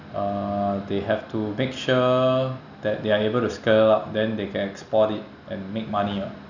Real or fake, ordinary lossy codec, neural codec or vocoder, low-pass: real; none; none; 7.2 kHz